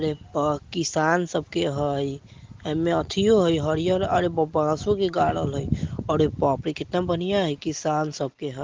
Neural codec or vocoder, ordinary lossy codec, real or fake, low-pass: none; Opus, 16 kbps; real; 7.2 kHz